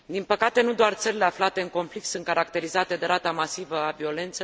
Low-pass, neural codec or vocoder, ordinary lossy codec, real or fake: none; none; none; real